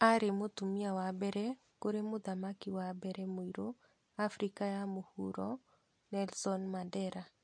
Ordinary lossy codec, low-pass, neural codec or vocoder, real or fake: MP3, 48 kbps; 9.9 kHz; none; real